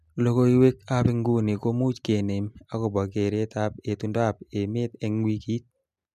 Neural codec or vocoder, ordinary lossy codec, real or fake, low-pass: none; MP3, 96 kbps; real; 14.4 kHz